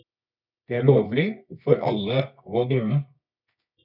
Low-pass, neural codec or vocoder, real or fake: 5.4 kHz; codec, 24 kHz, 0.9 kbps, WavTokenizer, medium music audio release; fake